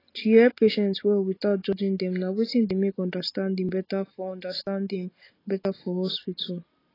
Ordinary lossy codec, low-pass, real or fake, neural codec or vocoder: AAC, 24 kbps; 5.4 kHz; real; none